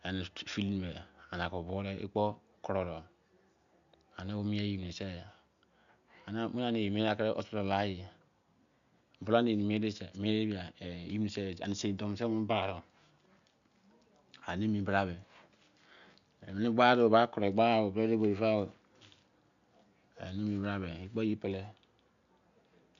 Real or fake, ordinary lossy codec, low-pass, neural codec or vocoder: real; none; 7.2 kHz; none